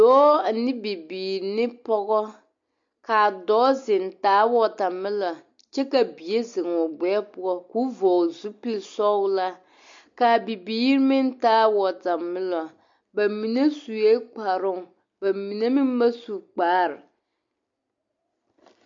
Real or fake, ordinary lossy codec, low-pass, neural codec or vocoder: real; MP3, 48 kbps; 7.2 kHz; none